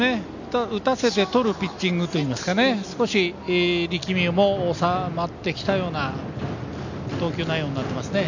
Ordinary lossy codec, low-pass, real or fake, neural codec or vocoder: none; 7.2 kHz; real; none